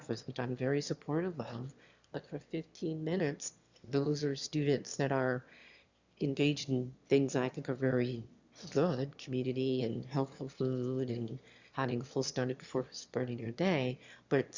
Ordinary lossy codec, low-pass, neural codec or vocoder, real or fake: Opus, 64 kbps; 7.2 kHz; autoencoder, 22.05 kHz, a latent of 192 numbers a frame, VITS, trained on one speaker; fake